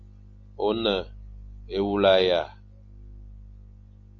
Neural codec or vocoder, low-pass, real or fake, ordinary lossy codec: none; 7.2 kHz; real; MP3, 48 kbps